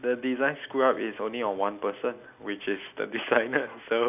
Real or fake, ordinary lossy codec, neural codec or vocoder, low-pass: real; none; none; 3.6 kHz